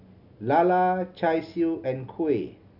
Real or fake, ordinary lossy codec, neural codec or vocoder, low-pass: real; none; none; 5.4 kHz